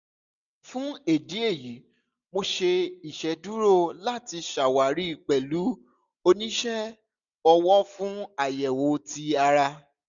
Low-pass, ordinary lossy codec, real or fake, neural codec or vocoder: 7.2 kHz; none; real; none